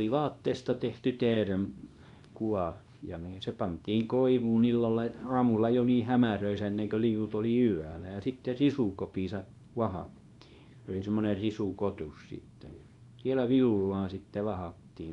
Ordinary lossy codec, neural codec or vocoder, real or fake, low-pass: none; codec, 24 kHz, 0.9 kbps, WavTokenizer, small release; fake; 10.8 kHz